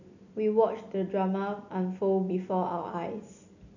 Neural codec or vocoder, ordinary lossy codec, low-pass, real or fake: none; none; 7.2 kHz; real